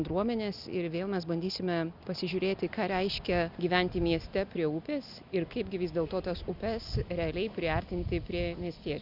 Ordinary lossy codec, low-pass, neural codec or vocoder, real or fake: Opus, 64 kbps; 5.4 kHz; none; real